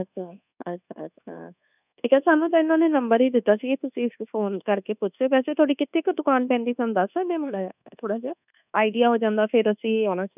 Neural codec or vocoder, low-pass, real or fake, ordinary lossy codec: codec, 24 kHz, 1.2 kbps, DualCodec; 3.6 kHz; fake; none